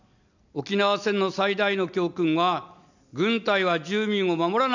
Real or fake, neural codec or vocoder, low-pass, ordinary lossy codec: real; none; 7.2 kHz; none